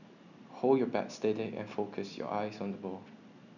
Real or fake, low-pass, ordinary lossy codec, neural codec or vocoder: real; 7.2 kHz; none; none